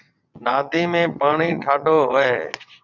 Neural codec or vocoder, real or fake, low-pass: vocoder, 22.05 kHz, 80 mel bands, WaveNeXt; fake; 7.2 kHz